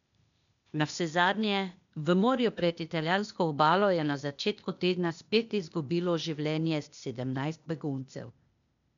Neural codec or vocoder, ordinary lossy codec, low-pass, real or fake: codec, 16 kHz, 0.8 kbps, ZipCodec; none; 7.2 kHz; fake